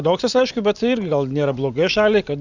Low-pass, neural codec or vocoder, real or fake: 7.2 kHz; none; real